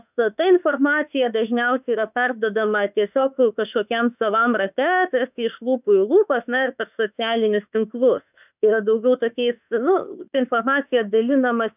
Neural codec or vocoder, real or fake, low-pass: autoencoder, 48 kHz, 32 numbers a frame, DAC-VAE, trained on Japanese speech; fake; 3.6 kHz